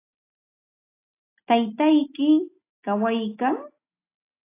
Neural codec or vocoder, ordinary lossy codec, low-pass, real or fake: none; AAC, 24 kbps; 3.6 kHz; real